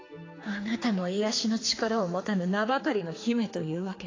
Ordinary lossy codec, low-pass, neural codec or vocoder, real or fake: AAC, 32 kbps; 7.2 kHz; codec, 16 kHz, 4 kbps, X-Codec, HuBERT features, trained on general audio; fake